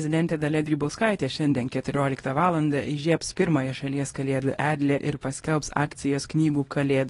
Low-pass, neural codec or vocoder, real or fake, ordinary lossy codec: 10.8 kHz; codec, 24 kHz, 0.9 kbps, WavTokenizer, small release; fake; AAC, 32 kbps